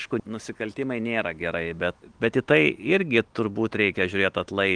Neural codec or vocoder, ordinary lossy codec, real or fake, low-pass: none; Opus, 24 kbps; real; 9.9 kHz